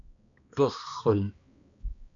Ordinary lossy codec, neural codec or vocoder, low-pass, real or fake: MP3, 48 kbps; codec, 16 kHz, 2 kbps, X-Codec, HuBERT features, trained on balanced general audio; 7.2 kHz; fake